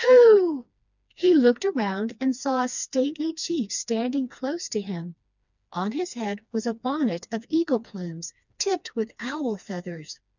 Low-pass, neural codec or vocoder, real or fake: 7.2 kHz; codec, 16 kHz, 2 kbps, FreqCodec, smaller model; fake